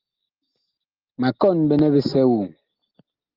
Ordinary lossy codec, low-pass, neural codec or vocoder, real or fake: Opus, 24 kbps; 5.4 kHz; none; real